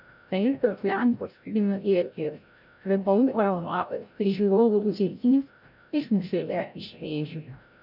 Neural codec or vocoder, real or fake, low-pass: codec, 16 kHz, 0.5 kbps, FreqCodec, larger model; fake; 5.4 kHz